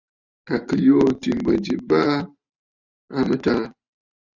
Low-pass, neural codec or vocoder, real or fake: 7.2 kHz; vocoder, 44.1 kHz, 128 mel bands every 512 samples, BigVGAN v2; fake